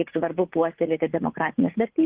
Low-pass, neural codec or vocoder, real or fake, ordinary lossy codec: 3.6 kHz; vocoder, 44.1 kHz, 80 mel bands, Vocos; fake; Opus, 16 kbps